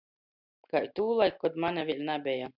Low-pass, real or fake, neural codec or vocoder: 5.4 kHz; real; none